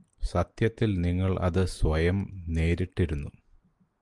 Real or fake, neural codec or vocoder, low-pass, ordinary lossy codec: real; none; 10.8 kHz; Opus, 24 kbps